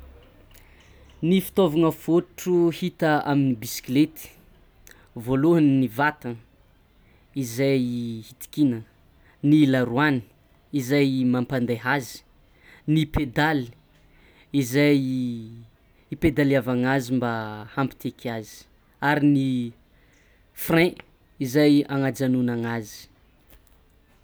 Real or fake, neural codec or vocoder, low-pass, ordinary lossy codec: real; none; none; none